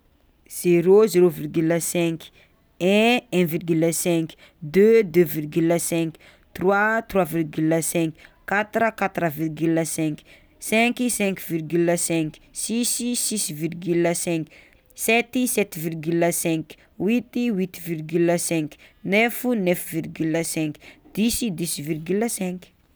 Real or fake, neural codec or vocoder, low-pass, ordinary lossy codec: real; none; none; none